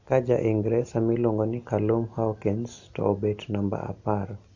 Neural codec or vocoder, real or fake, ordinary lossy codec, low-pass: none; real; AAC, 48 kbps; 7.2 kHz